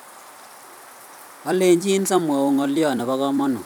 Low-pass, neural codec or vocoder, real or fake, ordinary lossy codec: none; vocoder, 44.1 kHz, 128 mel bands every 256 samples, BigVGAN v2; fake; none